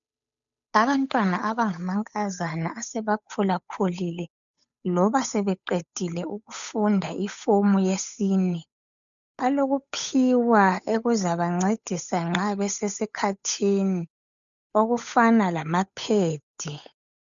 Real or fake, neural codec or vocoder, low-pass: fake; codec, 16 kHz, 8 kbps, FunCodec, trained on Chinese and English, 25 frames a second; 7.2 kHz